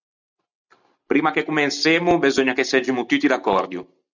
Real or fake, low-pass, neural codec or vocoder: real; 7.2 kHz; none